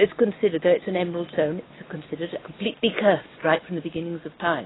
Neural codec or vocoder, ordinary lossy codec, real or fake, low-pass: none; AAC, 16 kbps; real; 7.2 kHz